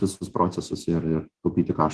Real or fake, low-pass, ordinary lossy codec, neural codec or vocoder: fake; 10.8 kHz; Opus, 16 kbps; autoencoder, 48 kHz, 128 numbers a frame, DAC-VAE, trained on Japanese speech